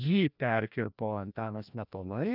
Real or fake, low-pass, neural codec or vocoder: fake; 5.4 kHz; codec, 16 kHz, 1 kbps, FreqCodec, larger model